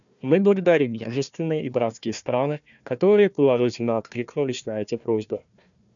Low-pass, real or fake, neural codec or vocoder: 7.2 kHz; fake; codec, 16 kHz, 1 kbps, FunCodec, trained on Chinese and English, 50 frames a second